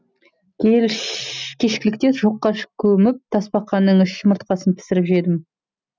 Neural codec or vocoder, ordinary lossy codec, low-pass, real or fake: none; none; none; real